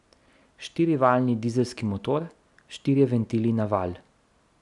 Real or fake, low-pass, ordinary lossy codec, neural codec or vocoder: real; 10.8 kHz; none; none